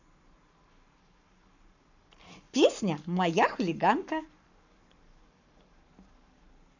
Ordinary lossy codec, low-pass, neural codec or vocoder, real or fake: none; 7.2 kHz; codec, 44.1 kHz, 7.8 kbps, Pupu-Codec; fake